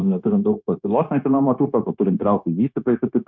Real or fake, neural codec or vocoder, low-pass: fake; codec, 16 kHz, 0.9 kbps, LongCat-Audio-Codec; 7.2 kHz